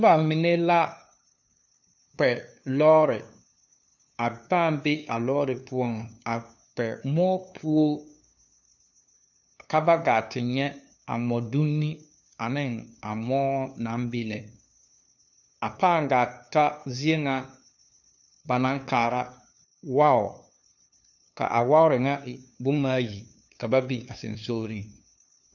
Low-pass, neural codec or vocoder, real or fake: 7.2 kHz; codec, 16 kHz, 2 kbps, FunCodec, trained on LibriTTS, 25 frames a second; fake